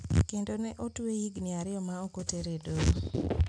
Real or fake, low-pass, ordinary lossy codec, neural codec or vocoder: real; 9.9 kHz; none; none